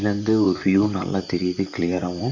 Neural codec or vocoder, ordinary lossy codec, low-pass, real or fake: vocoder, 44.1 kHz, 128 mel bands, Pupu-Vocoder; none; 7.2 kHz; fake